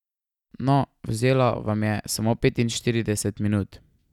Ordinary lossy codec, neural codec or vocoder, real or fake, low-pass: none; none; real; 19.8 kHz